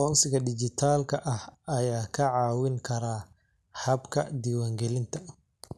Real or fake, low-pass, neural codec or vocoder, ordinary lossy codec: real; none; none; none